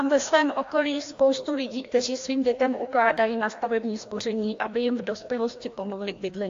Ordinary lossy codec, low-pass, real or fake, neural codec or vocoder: AAC, 48 kbps; 7.2 kHz; fake; codec, 16 kHz, 1 kbps, FreqCodec, larger model